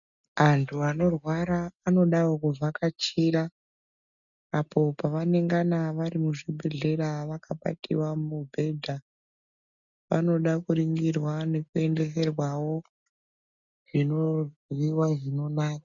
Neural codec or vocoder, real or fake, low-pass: none; real; 7.2 kHz